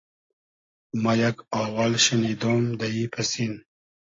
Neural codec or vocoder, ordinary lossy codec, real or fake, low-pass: none; AAC, 32 kbps; real; 7.2 kHz